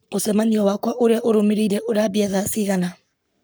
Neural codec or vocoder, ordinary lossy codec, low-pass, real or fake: codec, 44.1 kHz, 7.8 kbps, Pupu-Codec; none; none; fake